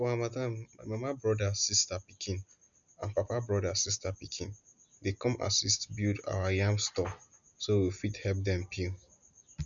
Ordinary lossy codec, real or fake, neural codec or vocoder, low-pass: none; real; none; 7.2 kHz